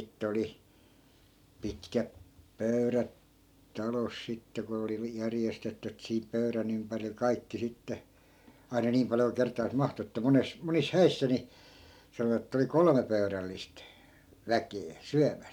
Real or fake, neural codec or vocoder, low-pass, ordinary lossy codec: real; none; 19.8 kHz; none